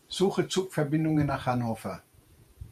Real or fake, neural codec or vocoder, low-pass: fake; vocoder, 48 kHz, 128 mel bands, Vocos; 14.4 kHz